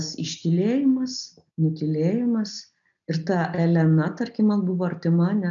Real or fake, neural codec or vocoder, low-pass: real; none; 7.2 kHz